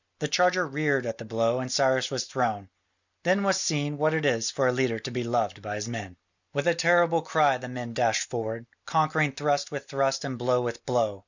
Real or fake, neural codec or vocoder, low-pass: real; none; 7.2 kHz